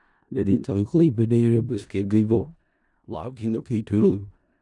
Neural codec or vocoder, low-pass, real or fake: codec, 16 kHz in and 24 kHz out, 0.4 kbps, LongCat-Audio-Codec, four codebook decoder; 10.8 kHz; fake